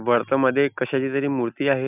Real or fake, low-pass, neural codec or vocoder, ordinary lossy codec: real; 3.6 kHz; none; none